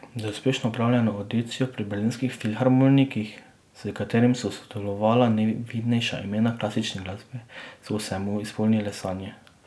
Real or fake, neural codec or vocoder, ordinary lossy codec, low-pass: real; none; none; none